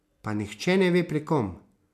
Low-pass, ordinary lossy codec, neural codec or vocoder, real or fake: 14.4 kHz; MP3, 96 kbps; none; real